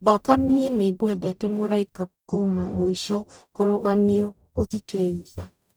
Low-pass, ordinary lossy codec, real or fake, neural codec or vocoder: none; none; fake; codec, 44.1 kHz, 0.9 kbps, DAC